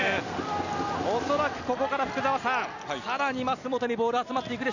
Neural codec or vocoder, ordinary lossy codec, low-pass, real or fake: none; none; 7.2 kHz; real